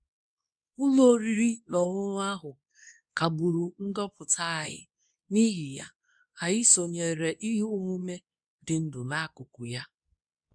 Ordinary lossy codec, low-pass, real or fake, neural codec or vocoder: none; 9.9 kHz; fake; codec, 24 kHz, 0.9 kbps, WavTokenizer, medium speech release version 2